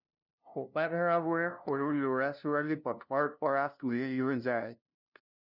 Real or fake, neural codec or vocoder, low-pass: fake; codec, 16 kHz, 0.5 kbps, FunCodec, trained on LibriTTS, 25 frames a second; 5.4 kHz